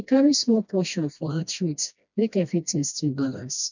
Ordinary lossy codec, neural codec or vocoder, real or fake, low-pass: none; codec, 16 kHz, 1 kbps, FreqCodec, smaller model; fake; 7.2 kHz